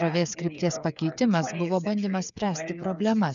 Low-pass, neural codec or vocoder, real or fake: 7.2 kHz; codec, 16 kHz, 8 kbps, FreqCodec, smaller model; fake